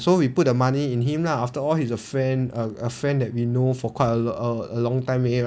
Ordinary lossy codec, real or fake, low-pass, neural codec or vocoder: none; real; none; none